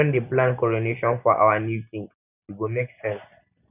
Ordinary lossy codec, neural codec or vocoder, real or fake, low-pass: none; none; real; 3.6 kHz